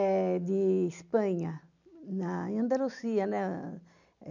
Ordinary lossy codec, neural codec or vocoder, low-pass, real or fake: none; none; 7.2 kHz; real